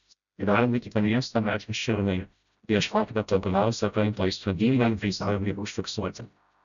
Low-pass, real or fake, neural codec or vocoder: 7.2 kHz; fake; codec, 16 kHz, 0.5 kbps, FreqCodec, smaller model